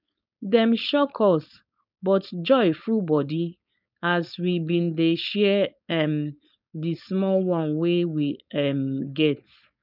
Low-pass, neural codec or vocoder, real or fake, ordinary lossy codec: 5.4 kHz; codec, 16 kHz, 4.8 kbps, FACodec; fake; none